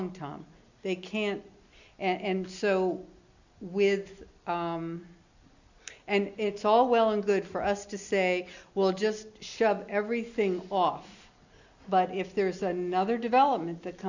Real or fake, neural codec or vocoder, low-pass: real; none; 7.2 kHz